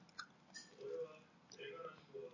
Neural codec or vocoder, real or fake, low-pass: none; real; 7.2 kHz